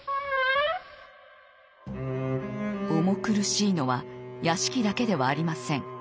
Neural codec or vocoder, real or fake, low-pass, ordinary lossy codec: none; real; none; none